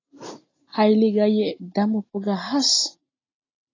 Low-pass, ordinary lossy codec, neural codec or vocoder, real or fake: 7.2 kHz; AAC, 32 kbps; none; real